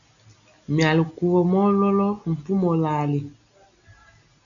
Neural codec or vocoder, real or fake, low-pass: none; real; 7.2 kHz